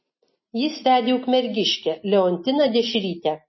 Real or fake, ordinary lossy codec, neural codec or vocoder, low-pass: real; MP3, 24 kbps; none; 7.2 kHz